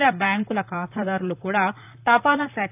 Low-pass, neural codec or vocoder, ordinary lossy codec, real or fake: 3.6 kHz; vocoder, 44.1 kHz, 128 mel bands, Pupu-Vocoder; AAC, 32 kbps; fake